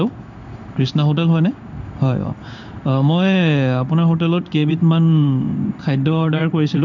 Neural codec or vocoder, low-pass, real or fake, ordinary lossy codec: codec, 16 kHz in and 24 kHz out, 1 kbps, XY-Tokenizer; 7.2 kHz; fake; none